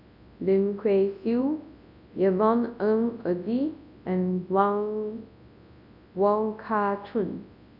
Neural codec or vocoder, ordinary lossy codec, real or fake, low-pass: codec, 24 kHz, 0.9 kbps, WavTokenizer, large speech release; none; fake; 5.4 kHz